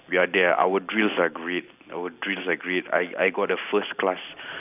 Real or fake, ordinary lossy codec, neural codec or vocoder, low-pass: real; AAC, 32 kbps; none; 3.6 kHz